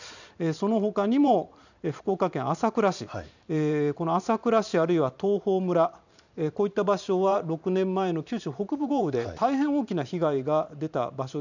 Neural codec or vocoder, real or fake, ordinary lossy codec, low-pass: vocoder, 44.1 kHz, 128 mel bands every 512 samples, BigVGAN v2; fake; none; 7.2 kHz